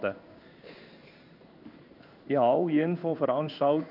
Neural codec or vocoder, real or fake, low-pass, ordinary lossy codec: codec, 16 kHz in and 24 kHz out, 1 kbps, XY-Tokenizer; fake; 5.4 kHz; none